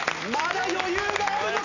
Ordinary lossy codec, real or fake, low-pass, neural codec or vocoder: none; real; 7.2 kHz; none